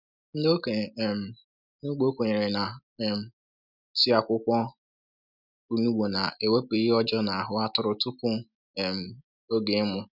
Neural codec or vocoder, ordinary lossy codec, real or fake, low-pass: none; none; real; 5.4 kHz